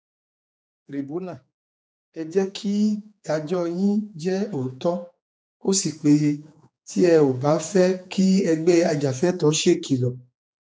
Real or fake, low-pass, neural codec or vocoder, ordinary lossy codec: fake; none; codec, 16 kHz, 4 kbps, X-Codec, HuBERT features, trained on general audio; none